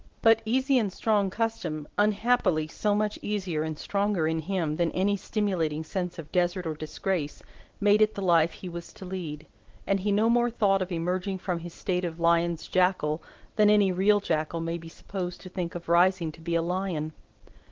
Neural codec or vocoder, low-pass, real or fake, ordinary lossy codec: none; 7.2 kHz; real; Opus, 16 kbps